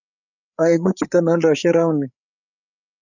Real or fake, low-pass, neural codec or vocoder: fake; 7.2 kHz; codec, 16 kHz, 8 kbps, FreqCodec, larger model